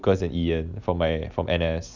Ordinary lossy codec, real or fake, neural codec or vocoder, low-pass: none; real; none; 7.2 kHz